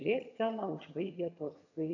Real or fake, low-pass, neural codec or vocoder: fake; 7.2 kHz; vocoder, 22.05 kHz, 80 mel bands, HiFi-GAN